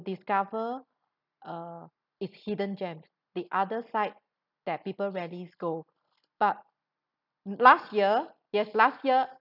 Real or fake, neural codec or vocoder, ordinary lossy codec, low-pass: real; none; none; 5.4 kHz